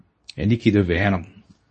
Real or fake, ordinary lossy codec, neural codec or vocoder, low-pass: fake; MP3, 32 kbps; codec, 24 kHz, 0.9 kbps, WavTokenizer, medium speech release version 2; 10.8 kHz